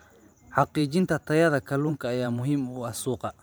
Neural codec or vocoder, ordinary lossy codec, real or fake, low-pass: vocoder, 44.1 kHz, 128 mel bands every 512 samples, BigVGAN v2; none; fake; none